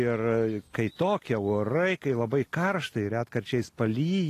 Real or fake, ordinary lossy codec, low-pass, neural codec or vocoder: real; AAC, 48 kbps; 14.4 kHz; none